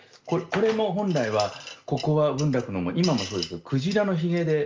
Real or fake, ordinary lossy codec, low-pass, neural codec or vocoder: real; Opus, 32 kbps; 7.2 kHz; none